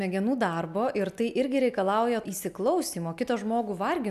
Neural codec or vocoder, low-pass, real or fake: none; 14.4 kHz; real